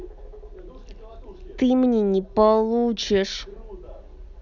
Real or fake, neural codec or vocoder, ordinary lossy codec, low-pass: real; none; none; 7.2 kHz